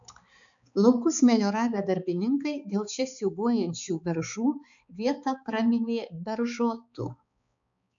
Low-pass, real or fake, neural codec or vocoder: 7.2 kHz; fake; codec, 16 kHz, 4 kbps, X-Codec, HuBERT features, trained on balanced general audio